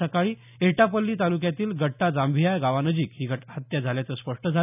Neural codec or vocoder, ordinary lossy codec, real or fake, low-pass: none; none; real; 3.6 kHz